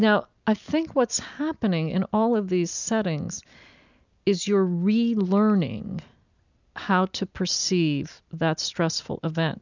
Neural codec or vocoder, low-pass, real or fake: none; 7.2 kHz; real